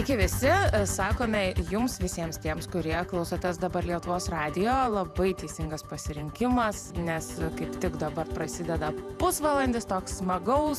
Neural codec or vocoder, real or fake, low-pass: vocoder, 48 kHz, 128 mel bands, Vocos; fake; 14.4 kHz